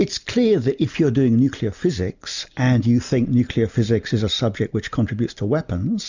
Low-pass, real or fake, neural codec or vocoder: 7.2 kHz; real; none